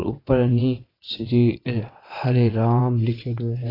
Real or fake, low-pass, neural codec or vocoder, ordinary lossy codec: fake; 5.4 kHz; vocoder, 22.05 kHz, 80 mel bands, WaveNeXt; AAC, 24 kbps